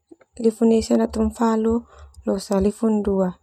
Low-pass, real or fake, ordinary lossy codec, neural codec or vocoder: 19.8 kHz; real; none; none